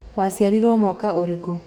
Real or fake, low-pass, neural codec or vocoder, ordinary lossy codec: fake; 19.8 kHz; codec, 44.1 kHz, 2.6 kbps, DAC; none